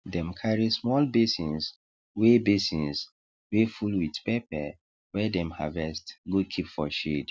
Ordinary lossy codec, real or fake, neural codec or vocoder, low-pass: none; real; none; none